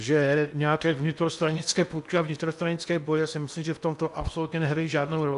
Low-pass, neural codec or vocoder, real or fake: 10.8 kHz; codec, 16 kHz in and 24 kHz out, 0.8 kbps, FocalCodec, streaming, 65536 codes; fake